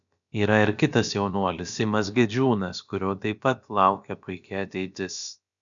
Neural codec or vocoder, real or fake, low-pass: codec, 16 kHz, about 1 kbps, DyCAST, with the encoder's durations; fake; 7.2 kHz